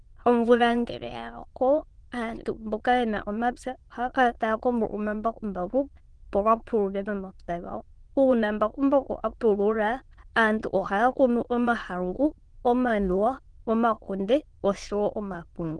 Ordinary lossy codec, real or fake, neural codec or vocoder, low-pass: Opus, 16 kbps; fake; autoencoder, 22.05 kHz, a latent of 192 numbers a frame, VITS, trained on many speakers; 9.9 kHz